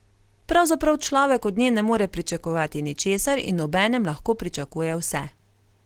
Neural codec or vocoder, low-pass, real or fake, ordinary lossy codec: none; 19.8 kHz; real; Opus, 16 kbps